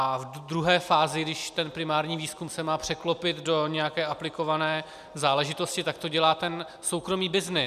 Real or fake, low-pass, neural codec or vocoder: real; 14.4 kHz; none